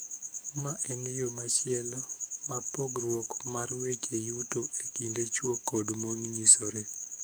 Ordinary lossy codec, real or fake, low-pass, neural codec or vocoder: none; fake; none; codec, 44.1 kHz, 7.8 kbps, DAC